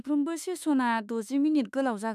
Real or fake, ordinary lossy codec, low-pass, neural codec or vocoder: fake; none; 14.4 kHz; autoencoder, 48 kHz, 32 numbers a frame, DAC-VAE, trained on Japanese speech